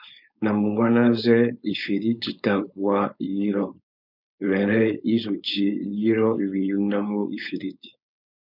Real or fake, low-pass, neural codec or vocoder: fake; 5.4 kHz; codec, 16 kHz, 4.8 kbps, FACodec